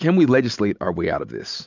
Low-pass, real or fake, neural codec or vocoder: 7.2 kHz; real; none